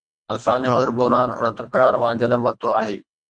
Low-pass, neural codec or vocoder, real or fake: 9.9 kHz; codec, 24 kHz, 1.5 kbps, HILCodec; fake